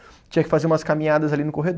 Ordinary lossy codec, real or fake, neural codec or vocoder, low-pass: none; real; none; none